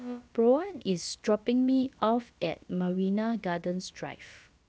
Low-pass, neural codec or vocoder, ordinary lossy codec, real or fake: none; codec, 16 kHz, about 1 kbps, DyCAST, with the encoder's durations; none; fake